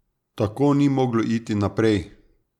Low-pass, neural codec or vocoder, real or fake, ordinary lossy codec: 19.8 kHz; none; real; none